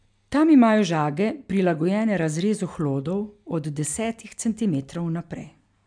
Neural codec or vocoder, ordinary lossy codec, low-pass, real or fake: none; MP3, 96 kbps; 9.9 kHz; real